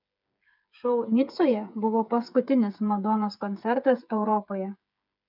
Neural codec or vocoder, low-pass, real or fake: codec, 16 kHz, 8 kbps, FreqCodec, smaller model; 5.4 kHz; fake